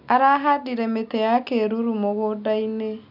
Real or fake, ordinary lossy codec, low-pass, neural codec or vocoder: real; none; 5.4 kHz; none